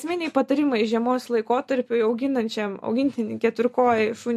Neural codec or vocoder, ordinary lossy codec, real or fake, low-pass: none; MP3, 64 kbps; real; 14.4 kHz